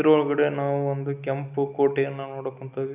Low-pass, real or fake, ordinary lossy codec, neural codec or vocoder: 3.6 kHz; real; none; none